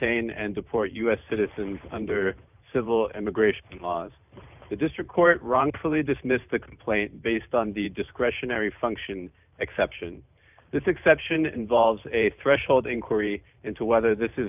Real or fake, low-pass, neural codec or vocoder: fake; 3.6 kHz; vocoder, 44.1 kHz, 128 mel bands every 512 samples, BigVGAN v2